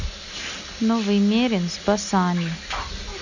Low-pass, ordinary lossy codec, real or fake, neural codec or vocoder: 7.2 kHz; none; real; none